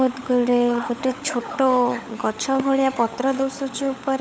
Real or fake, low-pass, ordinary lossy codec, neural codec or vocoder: fake; none; none; codec, 16 kHz, 16 kbps, FunCodec, trained on LibriTTS, 50 frames a second